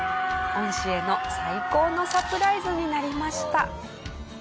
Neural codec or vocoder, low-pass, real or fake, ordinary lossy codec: none; none; real; none